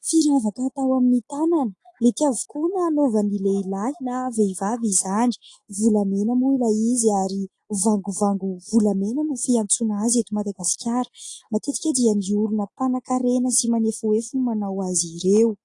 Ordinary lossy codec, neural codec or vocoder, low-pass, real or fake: AAC, 48 kbps; none; 10.8 kHz; real